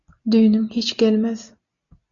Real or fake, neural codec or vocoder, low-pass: real; none; 7.2 kHz